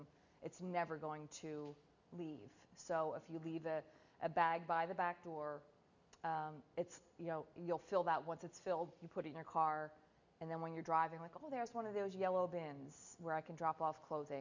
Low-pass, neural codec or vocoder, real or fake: 7.2 kHz; none; real